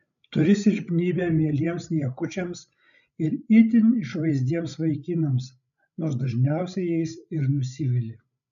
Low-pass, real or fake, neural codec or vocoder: 7.2 kHz; fake; codec, 16 kHz, 16 kbps, FreqCodec, larger model